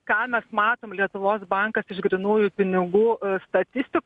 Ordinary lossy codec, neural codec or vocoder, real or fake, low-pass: MP3, 96 kbps; none; real; 10.8 kHz